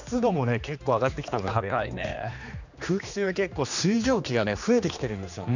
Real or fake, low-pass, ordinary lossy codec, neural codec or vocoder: fake; 7.2 kHz; none; codec, 16 kHz, 2 kbps, X-Codec, HuBERT features, trained on general audio